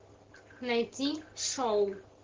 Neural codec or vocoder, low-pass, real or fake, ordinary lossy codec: none; 7.2 kHz; real; Opus, 16 kbps